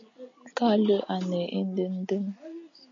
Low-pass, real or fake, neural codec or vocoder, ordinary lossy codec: 7.2 kHz; real; none; AAC, 64 kbps